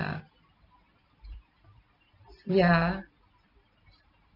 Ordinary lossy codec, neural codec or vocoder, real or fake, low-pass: none; vocoder, 44.1 kHz, 128 mel bands every 256 samples, BigVGAN v2; fake; 5.4 kHz